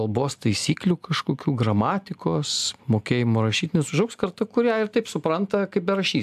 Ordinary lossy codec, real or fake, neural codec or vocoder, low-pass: AAC, 96 kbps; real; none; 14.4 kHz